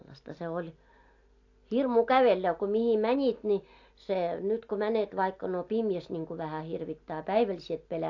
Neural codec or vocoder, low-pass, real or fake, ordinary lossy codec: none; 7.2 kHz; real; AAC, 48 kbps